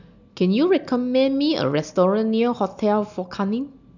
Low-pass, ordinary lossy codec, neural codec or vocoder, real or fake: 7.2 kHz; none; none; real